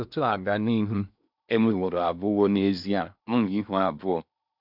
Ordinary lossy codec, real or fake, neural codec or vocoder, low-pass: none; fake; codec, 16 kHz in and 24 kHz out, 0.8 kbps, FocalCodec, streaming, 65536 codes; 5.4 kHz